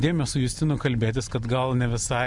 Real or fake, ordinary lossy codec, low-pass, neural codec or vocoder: real; Opus, 64 kbps; 10.8 kHz; none